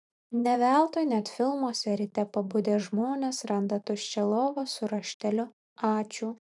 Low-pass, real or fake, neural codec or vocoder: 10.8 kHz; fake; vocoder, 44.1 kHz, 128 mel bands every 512 samples, BigVGAN v2